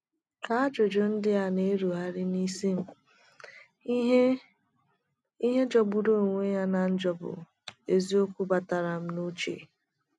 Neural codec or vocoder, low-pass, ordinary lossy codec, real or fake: none; none; none; real